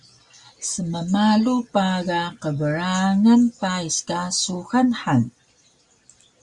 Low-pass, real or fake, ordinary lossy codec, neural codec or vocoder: 10.8 kHz; real; Opus, 64 kbps; none